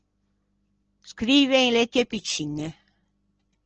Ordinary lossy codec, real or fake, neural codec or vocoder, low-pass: Opus, 16 kbps; real; none; 7.2 kHz